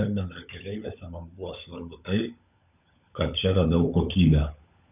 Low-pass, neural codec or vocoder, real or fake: 3.6 kHz; codec, 16 kHz, 16 kbps, FunCodec, trained on LibriTTS, 50 frames a second; fake